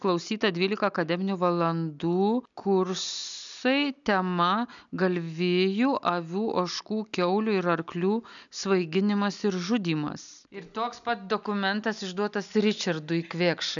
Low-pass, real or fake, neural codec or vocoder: 7.2 kHz; real; none